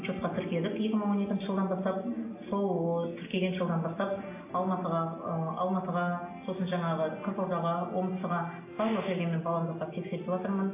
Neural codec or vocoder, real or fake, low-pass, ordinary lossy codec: none; real; 3.6 kHz; AAC, 24 kbps